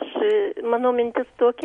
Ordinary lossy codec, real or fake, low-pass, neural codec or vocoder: MP3, 48 kbps; real; 19.8 kHz; none